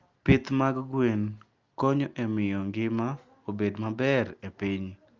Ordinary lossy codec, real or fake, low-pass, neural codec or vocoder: Opus, 32 kbps; real; 7.2 kHz; none